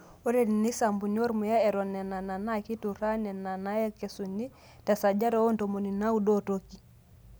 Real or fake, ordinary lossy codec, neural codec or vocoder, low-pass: real; none; none; none